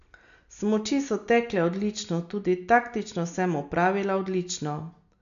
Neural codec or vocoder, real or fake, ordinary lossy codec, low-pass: none; real; none; 7.2 kHz